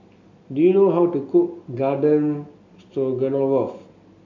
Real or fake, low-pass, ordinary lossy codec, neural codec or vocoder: real; 7.2 kHz; MP3, 48 kbps; none